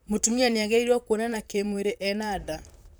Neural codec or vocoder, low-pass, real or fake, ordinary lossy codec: vocoder, 44.1 kHz, 128 mel bands, Pupu-Vocoder; none; fake; none